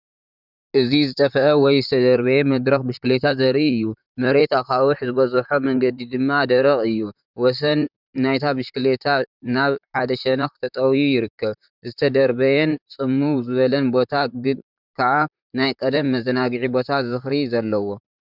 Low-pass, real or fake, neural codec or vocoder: 5.4 kHz; fake; vocoder, 44.1 kHz, 128 mel bands, Pupu-Vocoder